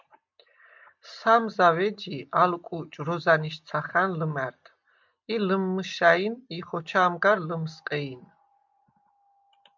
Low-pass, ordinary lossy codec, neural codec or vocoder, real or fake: 7.2 kHz; MP3, 64 kbps; none; real